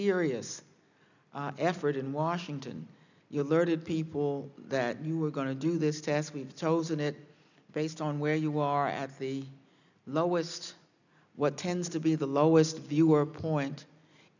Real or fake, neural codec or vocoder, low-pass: real; none; 7.2 kHz